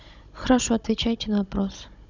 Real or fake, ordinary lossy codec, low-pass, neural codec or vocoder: fake; none; 7.2 kHz; codec, 16 kHz, 16 kbps, FunCodec, trained on Chinese and English, 50 frames a second